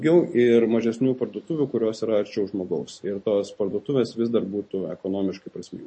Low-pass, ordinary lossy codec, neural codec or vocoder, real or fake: 9.9 kHz; MP3, 32 kbps; none; real